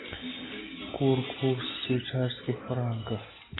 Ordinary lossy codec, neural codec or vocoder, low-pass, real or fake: AAC, 16 kbps; none; 7.2 kHz; real